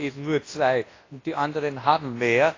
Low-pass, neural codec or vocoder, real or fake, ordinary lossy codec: 7.2 kHz; codec, 24 kHz, 0.9 kbps, WavTokenizer, large speech release; fake; AAC, 32 kbps